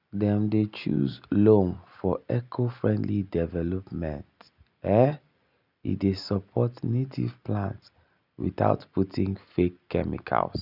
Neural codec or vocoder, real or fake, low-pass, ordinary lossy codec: none; real; 5.4 kHz; none